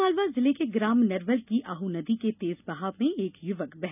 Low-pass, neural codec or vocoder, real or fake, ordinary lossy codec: 3.6 kHz; none; real; none